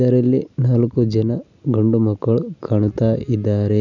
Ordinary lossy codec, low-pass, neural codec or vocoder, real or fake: none; 7.2 kHz; none; real